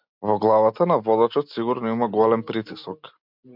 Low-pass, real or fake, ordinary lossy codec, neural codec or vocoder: 5.4 kHz; real; MP3, 48 kbps; none